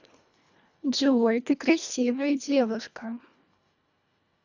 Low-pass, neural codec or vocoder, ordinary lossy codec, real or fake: 7.2 kHz; codec, 24 kHz, 1.5 kbps, HILCodec; none; fake